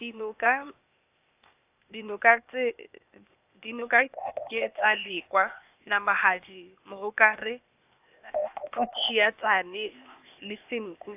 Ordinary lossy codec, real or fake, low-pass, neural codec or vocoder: AAC, 32 kbps; fake; 3.6 kHz; codec, 16 kHz, 0.8 kbps, ZipCodec